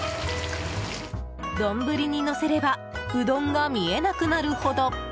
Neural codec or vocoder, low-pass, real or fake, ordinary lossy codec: none; none; real; none